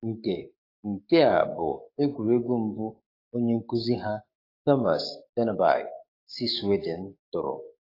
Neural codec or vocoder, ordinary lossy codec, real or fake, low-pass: codec, 44.1 kHz, 7.8 kbps, DAC; AAC, 24 kbps; fake; 5.4 kHz